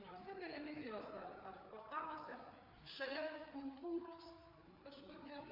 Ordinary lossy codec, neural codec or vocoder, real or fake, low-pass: MP3, 48 kbps; codec, 16 kHz, 4 kbps, FreqCodec, larger model; fake; 5.4 kHz